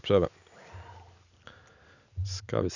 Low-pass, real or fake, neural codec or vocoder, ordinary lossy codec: 7.2 kHz; real; none; none